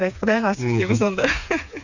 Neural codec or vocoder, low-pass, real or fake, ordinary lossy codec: codec, 16 kHz, 4 kbps, FreqCodec, smaller model; 7.2 kHz; fake; none